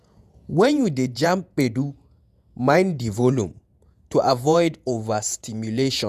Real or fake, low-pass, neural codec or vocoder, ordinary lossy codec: fake; 14.4 kHz; vocoder, 48 kHz, 128 mel bands, Vocos; none